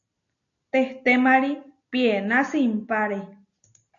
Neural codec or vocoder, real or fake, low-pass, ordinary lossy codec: none; real; 7.2 kHz; AAC, 64 kbps